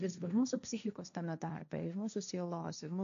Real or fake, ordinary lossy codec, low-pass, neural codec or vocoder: fake; MP3, 96 kbps; 7.2 kHz; codec, 16 kHz, 1.1 kbps, Voila-Tokenizer